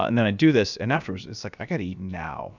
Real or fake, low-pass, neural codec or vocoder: fake; 7.2 kHz; codec, 16 kHz, about 1 kbps, DyCAST, with the encoder's durations